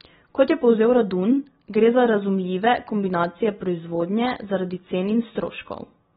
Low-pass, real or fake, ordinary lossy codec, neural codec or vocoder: 7.2 kHz; real; AAC, 16 kbps; none